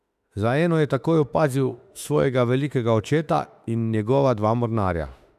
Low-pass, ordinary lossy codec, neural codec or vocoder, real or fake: 14.4 kHz; none; autoencoder, 48 kHz, 32 numbers a frame, DAC-VAE, trained on Japanese speech; fake